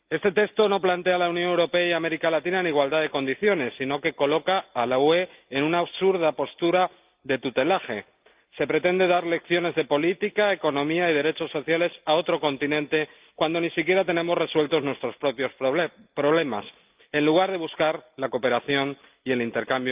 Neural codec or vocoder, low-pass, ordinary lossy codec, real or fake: none; 3.6 kHz; Opus, 24 kbps; real